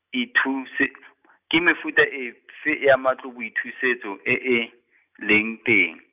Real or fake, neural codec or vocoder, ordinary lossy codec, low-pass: real; none; none; 3.6 kHz